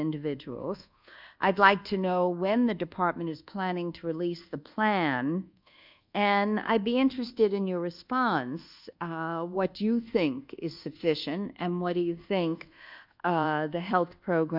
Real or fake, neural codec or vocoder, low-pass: fake; codec, 24 kHz, 1.2 kbps, DualCodec; 5.4 kHz